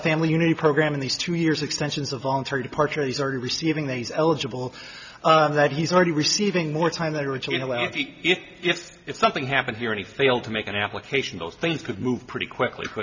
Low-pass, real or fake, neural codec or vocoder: 7.2 kHz; real; none